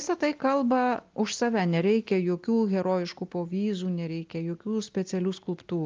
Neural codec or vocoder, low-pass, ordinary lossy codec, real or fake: none; 7.2 kHz; Opus, 24 kbps; real